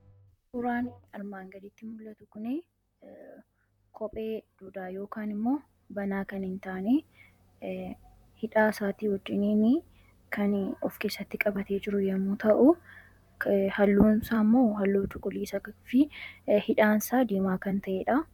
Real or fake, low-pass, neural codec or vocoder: fake; 19.8 kHz; vocoder, 44.1 kHz, 128 mel bands, Pupu-Vocoder